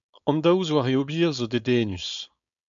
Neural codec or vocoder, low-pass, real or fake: codec, 16 kHz, 4.8 kbps, FACodec; 7.2 kHz; fake